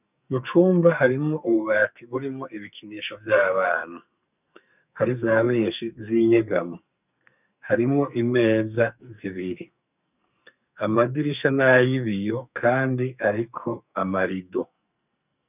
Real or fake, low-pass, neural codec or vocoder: fake; 3.6 kHz; codec, 44.1 kHz, 2.6 kbps, SNAC